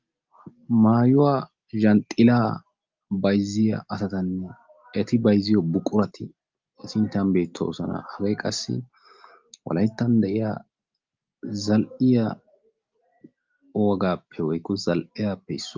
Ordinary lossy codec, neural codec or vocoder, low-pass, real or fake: Opus, 24 kbps; none; 7.2 kHz; real